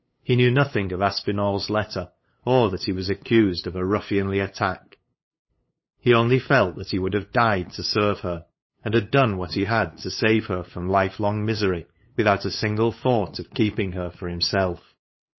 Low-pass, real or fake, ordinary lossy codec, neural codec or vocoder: 7.2 kHz; fake; MP3, 24 kbps; codec, 16 kHz, 8 kbps, FunCodec, trained on LibriTTS, 25 frames a second